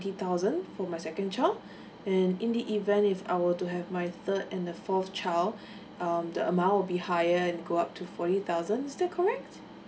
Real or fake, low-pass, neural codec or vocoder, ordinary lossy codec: real; none; none; none